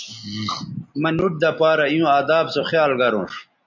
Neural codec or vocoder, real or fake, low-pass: none; real; 7.2 kHz